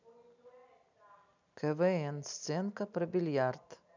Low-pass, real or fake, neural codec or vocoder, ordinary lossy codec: 7.2 kHz; real; none; none